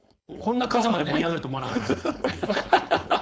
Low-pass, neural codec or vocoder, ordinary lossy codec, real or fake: none; codec, 16 kHz, 4.8 kbps, FACodec; none; fake